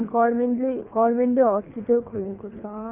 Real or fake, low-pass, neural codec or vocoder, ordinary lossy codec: fake; 3.6 kHz; codec, 24 kHz, 3 kbps, HILCodec; none